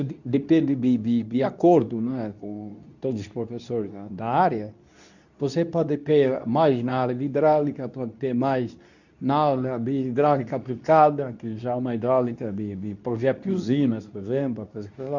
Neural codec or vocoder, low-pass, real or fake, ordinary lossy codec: codec, 24 kHz, 0.9 kbps, WavTokenizer, medium speech release version 2; 7.2 kHz; fake; none